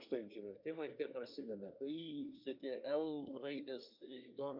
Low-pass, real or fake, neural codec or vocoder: 5.4 kHz; fake; codec, 24 kHz, 1 kbps, SNAC